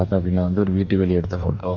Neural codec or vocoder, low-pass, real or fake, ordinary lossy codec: codec, 44.1 kHz, 2.6 kbps, DAC; 7.2 kHz; fake; none